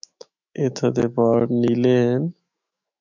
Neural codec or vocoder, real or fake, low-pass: autoencoder, 48 kHz, 128 numbers a frame, DAC-VAE, trained on Japanese speech; fake; 7.2 kHz